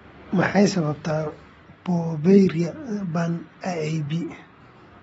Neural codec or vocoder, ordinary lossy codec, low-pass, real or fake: none; AAC, 24 kbps; 10.8 kHz; real